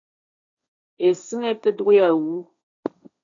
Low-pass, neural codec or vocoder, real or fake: 7.2 kHz; codec, 16 kHz, 1.1 kbps, Voila-Tokenizer; fake